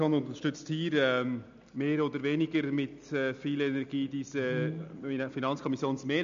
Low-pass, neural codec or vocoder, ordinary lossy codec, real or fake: 7.2 kHz; none; MP3, 64 kbps; real